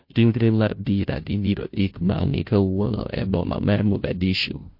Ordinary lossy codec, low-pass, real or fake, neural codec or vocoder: MP3, 32 kbps; 5.4 kHz; fake; codec, 16 kHz, 0.5 kbps, FunCodec, trained on Chinese and English, 25 frames a second